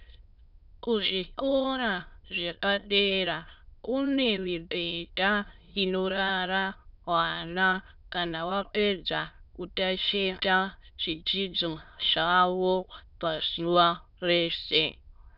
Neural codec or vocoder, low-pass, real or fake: autoencoder, 22.05 kHz, a latent of 192 numbers a frame, VITS, trained on many speakers; 5.4 kHz; fake